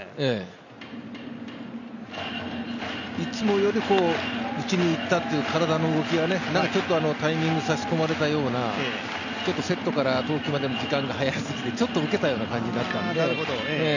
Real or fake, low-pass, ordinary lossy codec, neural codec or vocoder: real; 7.2 kHz; none; none